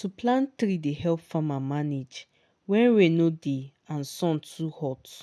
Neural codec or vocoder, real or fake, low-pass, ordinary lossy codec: none; real; none; none